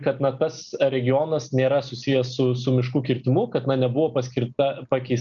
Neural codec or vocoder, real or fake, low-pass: none; real; 7.2 kHz